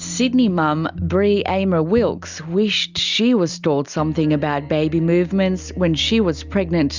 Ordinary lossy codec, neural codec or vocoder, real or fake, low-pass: Opus, 64 kbps; none; real; 7.2 kHz